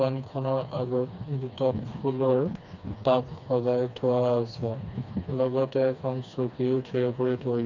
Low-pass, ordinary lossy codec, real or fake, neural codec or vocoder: 7.2 kHz; none; fake; codec, 16 kHz, 2 kbps, FreqCodec, smaller model